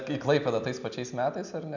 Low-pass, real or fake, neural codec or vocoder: 7.2 kHz; real; none